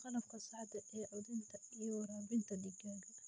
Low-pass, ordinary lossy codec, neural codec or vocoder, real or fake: none; none; none; real